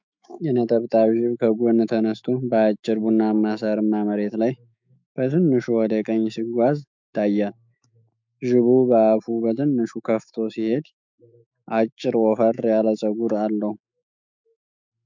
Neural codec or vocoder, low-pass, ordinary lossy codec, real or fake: autoencoder, 48 kHz, 128 numbers a frame, DAC-VAE, trained on Japanese speech; 7.2 kHz; MP3, 64 kbps; fake